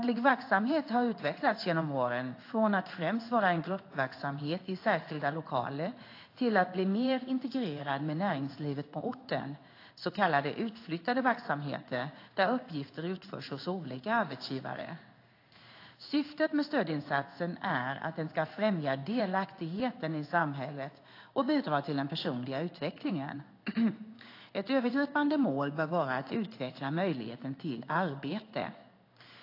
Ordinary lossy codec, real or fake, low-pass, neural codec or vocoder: AAC, 32 kbps; fake; 5.4 kHz; codec, 16 kHz in and 24 kHz out, 1 kbps, XY-Tokenizer